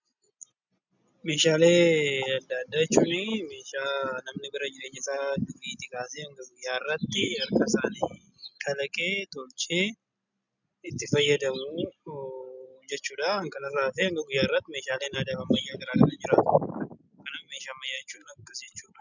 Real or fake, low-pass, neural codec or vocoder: real; 7.2 kHz; none